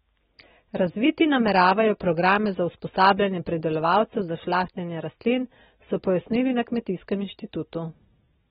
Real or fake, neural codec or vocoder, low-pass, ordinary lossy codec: real; none; 19.8 kHz; AAC, 16 kbps